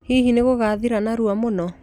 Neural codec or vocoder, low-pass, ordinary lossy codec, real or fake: none; 19.8 kHz; none; real